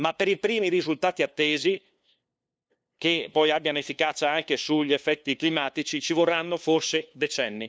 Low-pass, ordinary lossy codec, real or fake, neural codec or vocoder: none; none; fake; codec, 16 kHz, 2 kbps, FunCodec, trained on LibriTTS, 25 frames a second